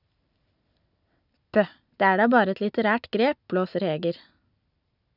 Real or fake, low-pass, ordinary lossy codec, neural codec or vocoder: real; 5.4 kHz; none; none